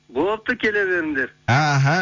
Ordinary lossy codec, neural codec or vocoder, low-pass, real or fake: MP3, 64 kbps; none; 7.2 kHz; real